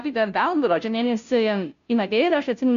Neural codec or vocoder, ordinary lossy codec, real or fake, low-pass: codec, 16 kHz, 0.5 kbps, FunCodec, trained on Chinese and English, 25 frames a second; AAC, 96 kbps; fake; 7.2 kHz